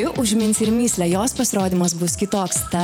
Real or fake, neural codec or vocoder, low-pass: fake; vocoder, 48 kHz, 128 mel bands, Vocos; 19.8 kHz